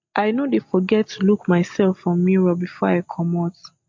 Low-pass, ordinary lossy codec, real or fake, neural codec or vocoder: 7.2 kHz; MP3, 48 kbps; real; none